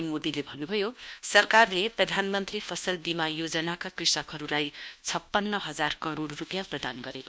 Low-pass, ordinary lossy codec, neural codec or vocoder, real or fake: none; none; codec, 16 kHz, 1 kbps, FunCodec, trained on LibriTTS, 50 frames a second; fake